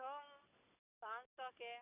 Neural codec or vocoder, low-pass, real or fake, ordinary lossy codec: none; 3.6 kHz; real; none